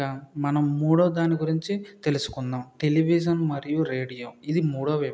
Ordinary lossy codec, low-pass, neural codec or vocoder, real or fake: none; none; none; real